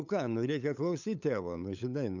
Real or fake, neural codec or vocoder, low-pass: fake; codec, 16 kHz, 8 kbps, FunCodec, trained on LibriTTS, 25 frames a second; 7.2 kHz